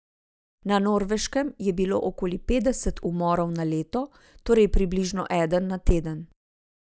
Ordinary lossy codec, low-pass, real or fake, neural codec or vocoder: none; none; real; none